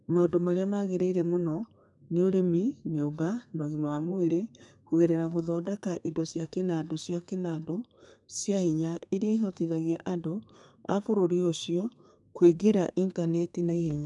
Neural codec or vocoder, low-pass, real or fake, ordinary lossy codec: codec, 32 kHz, 1.9 kbps, SNAC; 10.8 kHz; fake; none